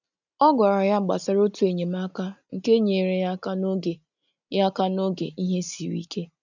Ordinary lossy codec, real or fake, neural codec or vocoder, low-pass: none; real; none; 7.2 kHz